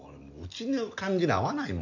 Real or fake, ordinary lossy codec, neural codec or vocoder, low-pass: real; none; none; 7.2 kHz